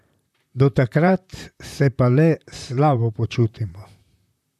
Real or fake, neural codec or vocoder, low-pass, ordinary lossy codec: fake; vocoder, 44.1 kHz, 128 mel bands, Pupu-Vocoder; 14.4 kHz; none